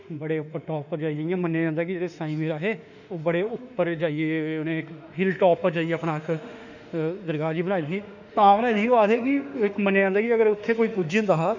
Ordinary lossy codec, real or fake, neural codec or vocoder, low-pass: none; fake; autoencoder, 48 kHz, 32 numbers a frame, DAC-VAE, trained on Japanese speech; 7.2 kHz